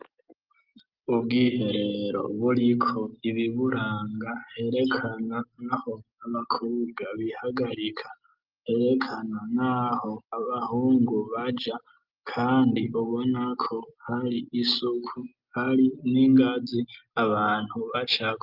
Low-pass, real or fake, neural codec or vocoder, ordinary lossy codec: 5.4 kHz; real; none; Opus, 24 kbps